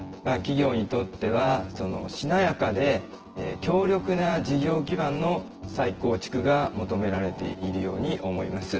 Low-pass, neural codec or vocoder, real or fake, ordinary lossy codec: 7.2 kHz; vocoder, 24 kHz, 100 mel bands, Vocos; fake; Opus, 16 kbps